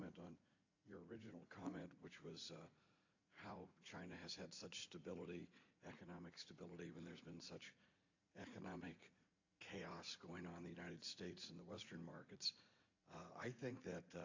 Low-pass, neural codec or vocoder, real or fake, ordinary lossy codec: 7.2 kHz; vocoder, 44.1 kHz, 80 mel bands, Vocos; fake; AAC, 48 kbps